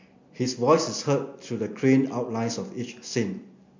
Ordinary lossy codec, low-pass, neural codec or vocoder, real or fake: MP3, 48 kbps; 7.2 kHz; none; real